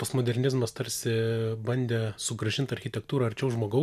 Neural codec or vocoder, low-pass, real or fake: none; 14.4 kHz; real